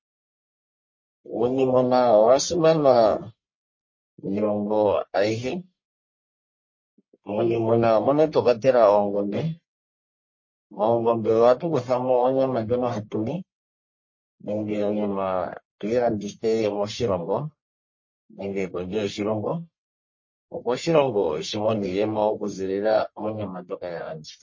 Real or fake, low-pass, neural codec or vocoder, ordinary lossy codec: fake; 7.2 kHz; codec, 44.1 kHz, 1.7 kbps, Pupu-Codec; MP3, 32 kbps